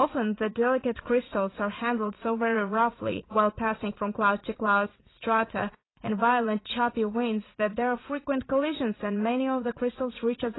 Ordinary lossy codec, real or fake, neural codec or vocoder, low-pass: AAC, 16 kbps; fake; vocoder, 44.1 kHz, 80 mel bands, Vocos; 7.2 kHz